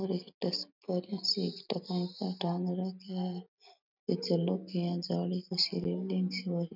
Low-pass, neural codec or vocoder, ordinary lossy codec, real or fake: 5.4 kHz; none; none; real